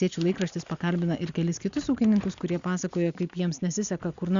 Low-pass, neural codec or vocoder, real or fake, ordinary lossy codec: 7.2 kHz; none; real; Opus, 64 kbps